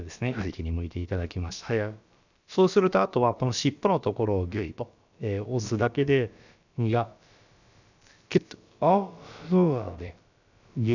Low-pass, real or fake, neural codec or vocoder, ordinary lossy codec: 7.2 kHz; fake; codec, 16 kHz, about 1 kbps, DyCAST, with the encoder's durations; none